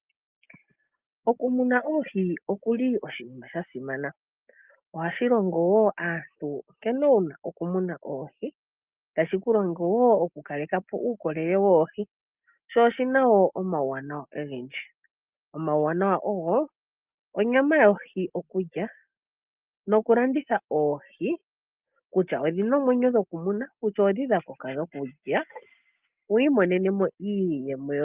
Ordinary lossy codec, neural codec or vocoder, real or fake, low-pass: Opus, 24 kbps; none; real; 3.6 kHz